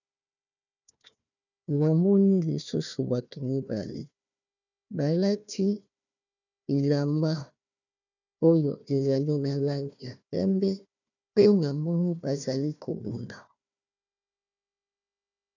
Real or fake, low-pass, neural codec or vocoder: fake; 7.2 kHz; codec, 16 kHz, 1 kbps, FunCodec, trained on Chinese and English, 50 frames a second